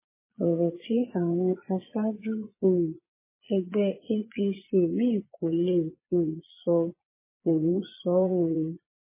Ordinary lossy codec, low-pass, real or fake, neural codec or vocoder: MP3, 16 kbps; 3.6 kHz; fake; vocoder, 22.05 kHz, 80 mel bands, Vocos